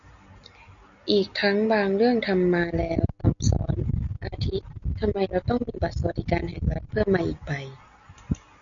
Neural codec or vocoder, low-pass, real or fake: none; 7.2 kHz; real